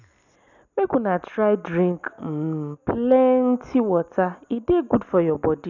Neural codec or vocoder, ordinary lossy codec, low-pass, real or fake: none; none; 7.2 kHz; real